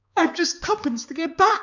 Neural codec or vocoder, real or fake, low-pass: codec, 16 kHz, 4 kbps, X-Codec, HuBERT features, trained on balanced general audio; fake; 7.2 kHz